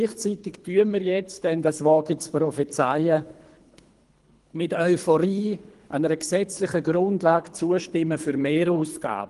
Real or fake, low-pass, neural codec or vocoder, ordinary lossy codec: fake; 10.8 kHz; codec, 24 kHz, 3 kbps, HILCodec; Opus, 24 kbps